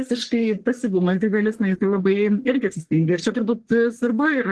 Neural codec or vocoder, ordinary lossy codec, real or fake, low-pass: codec, 44.1 kHz, 1.7 kbps, Pupu-Codec; Opus, 16 kbps; fake; 10.8 kHz